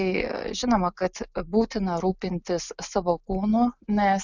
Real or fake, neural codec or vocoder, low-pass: real; none; 7.2 kHz